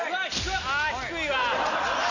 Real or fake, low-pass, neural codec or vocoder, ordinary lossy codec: real; 7.2 kHz; none; none